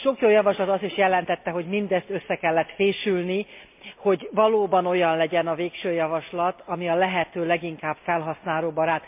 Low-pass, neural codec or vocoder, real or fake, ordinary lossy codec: 3.6 kHz; none; real; MP3, 32 kbps